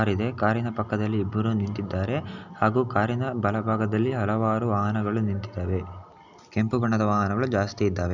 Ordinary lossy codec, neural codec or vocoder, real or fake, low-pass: none; none; real; 7.2 kHz